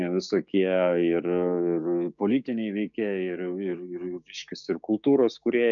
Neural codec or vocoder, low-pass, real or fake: codec, 16 kHz, 6 kbps, DAC; 7.2 kHz; fake